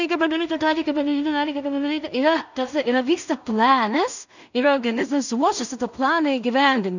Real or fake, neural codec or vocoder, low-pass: fake; codec, 16 kHz in and 24 kHz out, 0.4 kbps, LongCat-Audio-Codec, two codebook decoder; 7.2 kHz